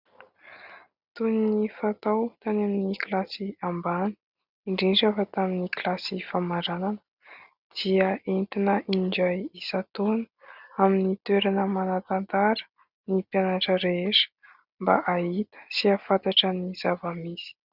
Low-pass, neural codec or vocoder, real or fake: 5.4 kHz; none; real